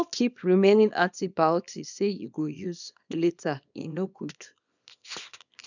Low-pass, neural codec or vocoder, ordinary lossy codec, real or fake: 7.2 kHz; codec, 24 kHz, 0.9 kbps, WavTokenizer, small release; none; fake